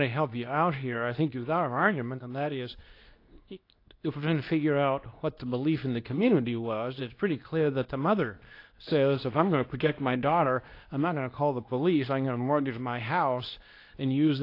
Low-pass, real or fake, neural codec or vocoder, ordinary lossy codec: 5.4 kHz; fake; codec, 24 kHz, 0.9 kbps, WavTokenizer, medium speech release version 2; AAC, 32 kbps